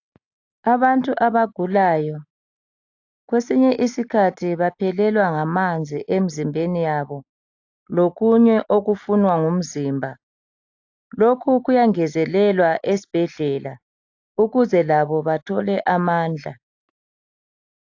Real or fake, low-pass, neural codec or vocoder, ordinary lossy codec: real; 7.2 kHz; none; AAC, 48 kbps